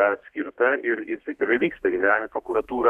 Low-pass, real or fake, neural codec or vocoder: 14.4 kHz; fake; codec, 32 kHz, 1.9 kbps, SNAC